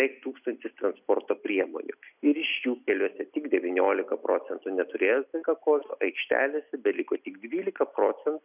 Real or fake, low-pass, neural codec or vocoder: real; 3.6 kHz; none